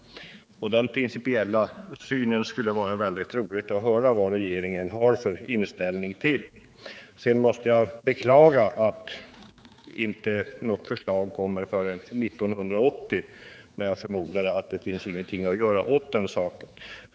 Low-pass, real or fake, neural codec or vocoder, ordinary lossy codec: none; fake; codec, 16 kHz, 4 kbps, X-Codec, HuBERT features, trained on general audio; none